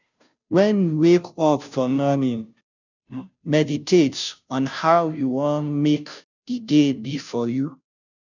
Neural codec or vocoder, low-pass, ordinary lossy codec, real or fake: codec, 16 kHz, 0.5 kbps, FunCodec, trained on Chinese and English, 25 frames a second; 7.2 kHz; none; fake